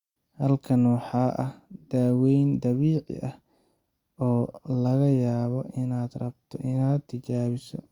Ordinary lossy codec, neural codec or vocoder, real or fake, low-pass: Opus, 64 kbps; none; real; 19.8 kHz